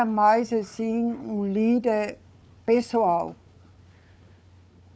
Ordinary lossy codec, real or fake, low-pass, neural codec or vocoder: none; fake; none; codec, 16 kHz, 4 kbps, FunCodec, trained on Chinese and English, 50 frames a second